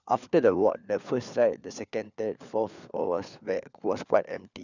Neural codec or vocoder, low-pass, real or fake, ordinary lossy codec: codec, 16 kHz, 4 kbps, FunCodec, trained on LibriTTS, 50 frames a second; 7.2 kHz; fake; none